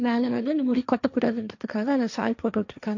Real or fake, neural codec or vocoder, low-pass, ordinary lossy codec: fake; codec, 16 kHz, 1.1 kbps, Voila-Tokenizer; 7.2 kHz; none